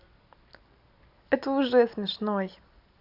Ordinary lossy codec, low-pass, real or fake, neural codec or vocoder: none; 5.4 kHz; real; none